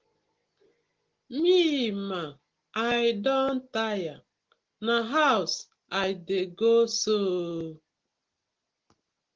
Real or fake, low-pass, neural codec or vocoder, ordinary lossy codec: real; 7.2 kHz; none; Opus, 16 kbps